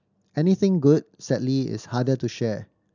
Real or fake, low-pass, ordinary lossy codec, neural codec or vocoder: real; 7.2 kHz; none; none